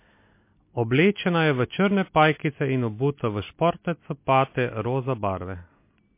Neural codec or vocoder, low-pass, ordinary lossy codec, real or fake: none; 3.6 kHz; MP3, 24 kbps; real